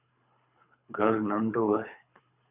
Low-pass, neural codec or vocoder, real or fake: 3.6 kHz; codec, 24 kHz, 3 kbps, HILCodec; fake